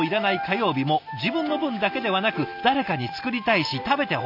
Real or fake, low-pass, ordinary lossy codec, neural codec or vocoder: real; 5.4 kHz; none; none